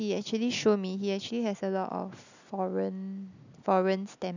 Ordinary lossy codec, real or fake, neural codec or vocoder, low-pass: none; real; none; 7.2 kHz